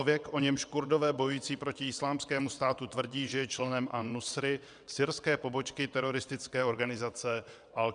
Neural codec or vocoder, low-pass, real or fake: vocoder, 22.05 kHz, 80 mel bands, WaveNeXt; 9.9 kHz; fake